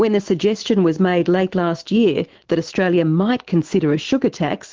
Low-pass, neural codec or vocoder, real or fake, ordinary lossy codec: 7.2 kHz; autoencoder, 48 kHz, 128 numbers a frame, DAC-VAE, trained on Japanese speech; fake; Opus, 16 kbps